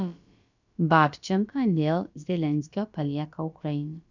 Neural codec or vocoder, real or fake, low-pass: codec, 16 kHz, about 1 kbps, DyCAST, with the encoder's durations; fake; 7.2 kHz